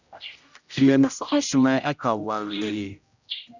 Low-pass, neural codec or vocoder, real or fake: 7.2 kHz; codec, 16 kHz, 0.5 kbps, X-Codec, HuBERT features, trained on general audio; fake